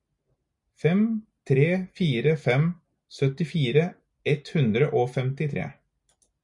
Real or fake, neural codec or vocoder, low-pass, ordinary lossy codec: real; none; 10.8 kHz; MP3, 96 kbps